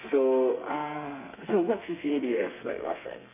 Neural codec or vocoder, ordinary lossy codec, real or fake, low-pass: codec, 32 kHz, 1.9 kbps, SNAC; none; fake; 3.6 kHz